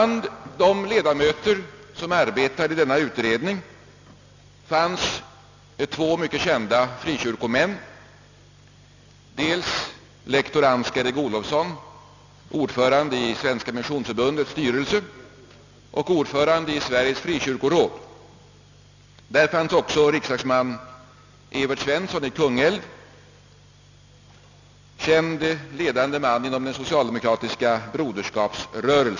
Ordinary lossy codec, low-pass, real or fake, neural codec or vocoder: none; 7.2 kHz; real; none